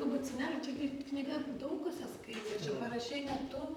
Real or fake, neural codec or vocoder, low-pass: fake; vocoder, 44.1 kHz, 128 mel bands, Pupu-Vocoder; 19.8 kHz